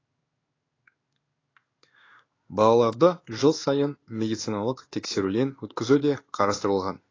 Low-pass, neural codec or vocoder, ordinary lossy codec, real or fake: 7.2 kHz; codec, 16 kHz in and 24 kHz out, 1 kbps, XY-Tokenizer; AAC, 32 kbps; fake